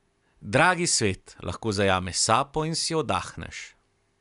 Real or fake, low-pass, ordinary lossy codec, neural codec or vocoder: real; 10.8 kHz; none; none